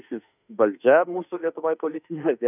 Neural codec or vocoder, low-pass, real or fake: autoencoder, 48 kHz, 32 numbers a frame, DAC-VAE, trained on Japanese speech; 3.6 kHz; fake